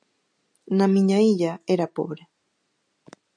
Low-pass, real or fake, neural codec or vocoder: 9.9 kHz; real; none